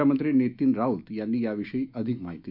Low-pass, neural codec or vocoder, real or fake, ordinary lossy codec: 5.4 kHz; autoencoder, 48 kHz, 128 numbers a frame, DAC-VAE, trained on Japanese speech; fake; none